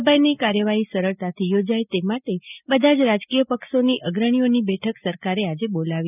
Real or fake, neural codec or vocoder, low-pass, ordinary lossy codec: real; none; 3.6 kHz; none